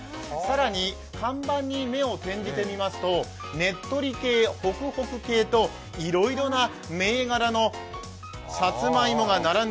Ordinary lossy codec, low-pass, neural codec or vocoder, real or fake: none; none; none; real